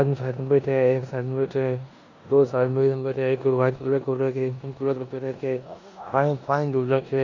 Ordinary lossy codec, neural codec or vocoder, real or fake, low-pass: none; codec, 16 kHz in and 24 kHz out, 0.9 kbps, LongCat-Audio-Codec, four codebook decoder; fake; 7.2 kHz